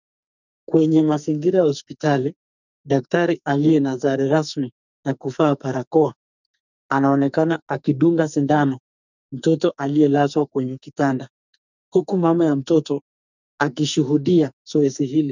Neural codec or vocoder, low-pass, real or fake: codec, 44.1 kHz, 2.6 kbps, SNAC; 7.2 kHz; fake